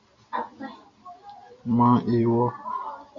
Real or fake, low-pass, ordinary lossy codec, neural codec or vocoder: real; 7.2 kHz; MP3, 48 kbps; none